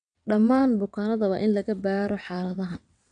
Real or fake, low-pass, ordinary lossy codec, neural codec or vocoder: fake; 9.9 kHz; none; vocoder, 22.05 kHz, 80 mel bands, WaveNeXt